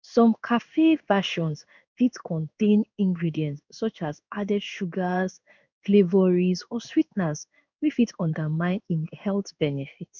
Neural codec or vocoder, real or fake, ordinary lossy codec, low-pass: codec, 16 kHz in and 24 kHz out, 1 kbps, XY-Tokenizer; fake; none; 7.2 kHz